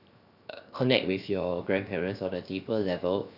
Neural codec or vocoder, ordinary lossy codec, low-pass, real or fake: codec, 16 kHz, 0.7 kbps, FocalCodec; none; 5.4 kHz; fake